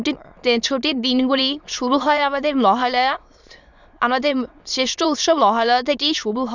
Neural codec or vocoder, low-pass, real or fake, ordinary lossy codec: autoencoder, 22.05 kHz, a latent of 192 numbers a frame, VITS, trained on many speakers; 7.2 kHz; fake; none